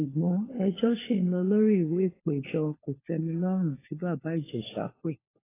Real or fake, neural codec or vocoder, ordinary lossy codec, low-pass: fake; codec, 16 kHz, 4 kbps, FunCodec, trained on LibriTTS, 50 frames a second; AAC, 16 kbps; 3.6 kHz